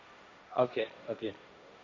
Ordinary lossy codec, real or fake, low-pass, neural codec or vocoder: none; fake; none; codec, 16 kHz, 1.1 kbps, Voila-Tokenizer